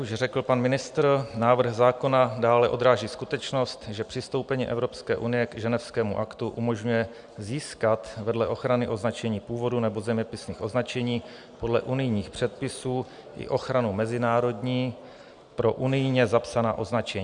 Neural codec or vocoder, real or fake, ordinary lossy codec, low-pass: none; real; Opus, 64 kbps; 9.9 kHz